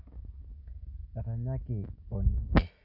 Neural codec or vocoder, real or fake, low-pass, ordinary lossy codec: none; real; 5.4 kHz; none